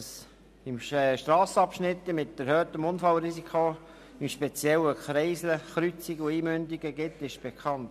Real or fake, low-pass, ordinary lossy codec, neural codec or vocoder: real; 14.4 kHz; none; none